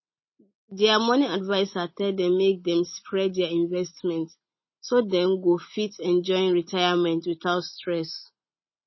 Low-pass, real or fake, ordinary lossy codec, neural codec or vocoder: 7.2 kHz; real; MP3, 24 kbps; none